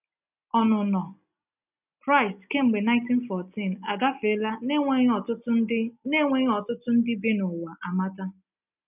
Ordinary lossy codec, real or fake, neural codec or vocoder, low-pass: none; real; none; 3.6 kHz